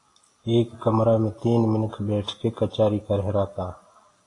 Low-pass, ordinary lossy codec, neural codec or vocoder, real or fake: 10.8 kHz; AAC, 32 kbps; none; real